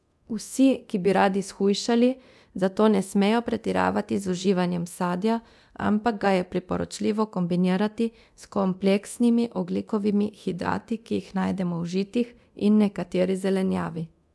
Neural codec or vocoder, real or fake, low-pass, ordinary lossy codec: codec, 24 kHz, 0.9 kbps, DualCodec; fake; none; none